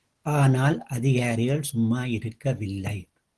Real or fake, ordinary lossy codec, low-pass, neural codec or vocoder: real; Opus, 16 kbps; 10.8 kHz; none